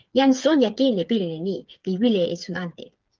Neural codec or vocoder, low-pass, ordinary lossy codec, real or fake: codec, 16 kHz, 8 kbps, FreqCodec, smaller model; 7.2 kHz; Opus, 32 kbps; fake